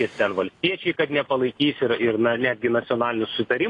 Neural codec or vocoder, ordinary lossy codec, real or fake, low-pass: none; AAC, 32 kbps; real; 10.8 kHz